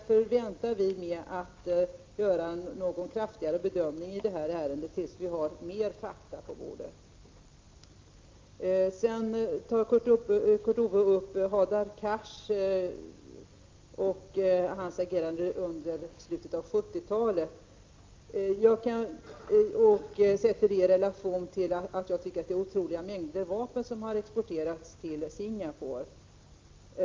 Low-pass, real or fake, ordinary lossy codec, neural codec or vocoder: 7.2 kHz; real; Opus, 24 kbps; none